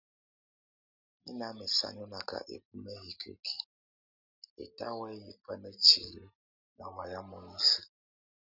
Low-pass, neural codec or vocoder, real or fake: 5.4 kHz; none; real